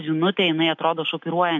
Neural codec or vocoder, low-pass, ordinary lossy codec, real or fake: none; 7.2 kHz; MP3, 64 kbps; real